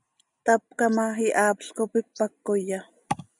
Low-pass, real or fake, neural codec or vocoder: 10.8 kHz; real; none